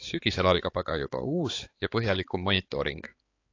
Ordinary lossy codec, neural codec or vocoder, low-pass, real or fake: AAC, 32 kbps; codec, 16 kHz, 4 kbps, X-Codec, HuBERT features, trained on balanced general audio; 7.2 kHz; fake